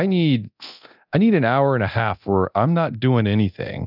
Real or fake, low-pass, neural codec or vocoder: fake; 5.4 kHz; codec, 24 kHz, 0.9 kbps, DualCodec